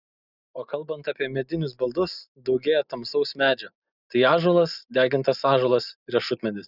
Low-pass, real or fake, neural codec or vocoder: 5.4 kHz; real; none